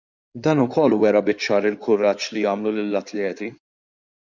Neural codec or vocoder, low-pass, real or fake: codec, 16 kHz in and 24 kHz out, 2.2 kbps, FireRedTTS-2 codec; 7.2 kHz; fake